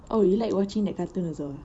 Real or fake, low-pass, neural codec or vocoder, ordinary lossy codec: fake; 9.9 kHz; vocoder, 44.1 kHz, 128 mel bands every 512 samples, BigVGAN v2; none